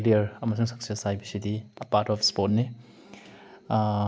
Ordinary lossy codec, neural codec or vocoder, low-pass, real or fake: none; none; none; real